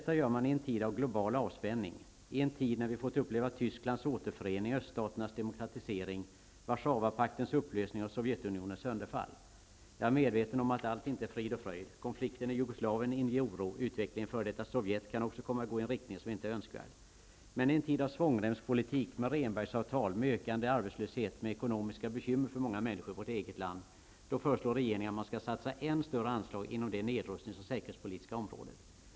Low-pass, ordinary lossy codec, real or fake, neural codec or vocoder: none; none; real; none